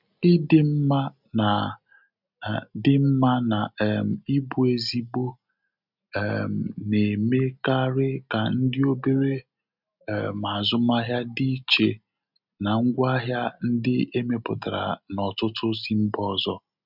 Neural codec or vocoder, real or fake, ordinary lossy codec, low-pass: none; real; none; 5.4 kHz